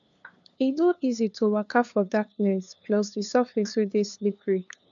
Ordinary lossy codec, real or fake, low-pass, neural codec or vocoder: none; fake; 7.2 kHz; codec, 16 kHz, 2 kbps, FunCodec, trained on LibriTTS, 25 frames a second